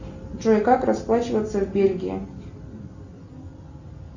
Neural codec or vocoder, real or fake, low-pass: none; real; 7.2 kHz